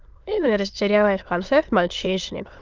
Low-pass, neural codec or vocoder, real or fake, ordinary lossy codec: 7.2 kHz; autoencoder, 22.05 kHz, a latent of 192 numbers a frame, VITS, trained on many speakers; fake; Opus, 24 kbps